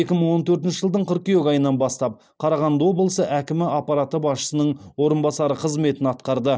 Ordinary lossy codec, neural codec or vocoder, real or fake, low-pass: none; none; real; none